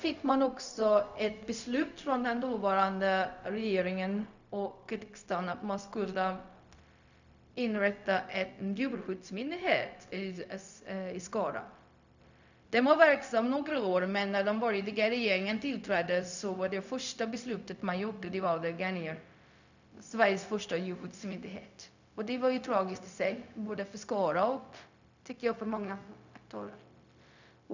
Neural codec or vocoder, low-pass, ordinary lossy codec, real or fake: codec, 16 kHz, 0.4 kbps, LongCat-Audio-Codec; 7.2 kHz; none; fake